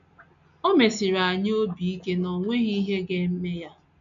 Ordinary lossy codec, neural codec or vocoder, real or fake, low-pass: MP3, 64 kbps; none; real; 7.2 kHz